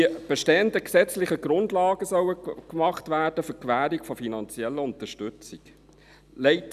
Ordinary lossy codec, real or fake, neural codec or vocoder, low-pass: none; real; none; 14.4 kHz